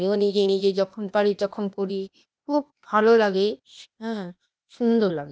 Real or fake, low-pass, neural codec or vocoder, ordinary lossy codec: fake; none; codec, 16 kHz, 0.8 kbps, ZipCodec; none